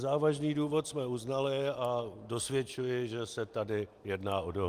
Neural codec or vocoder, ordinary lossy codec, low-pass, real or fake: none; Opus, 32 kbps; 14.4 kHz; real